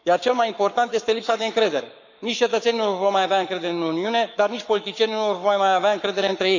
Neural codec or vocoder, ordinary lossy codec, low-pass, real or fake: codec, 44.1 kHz, 7.8 kbps, Pupu-Codec; none; 7.2 kHz; fake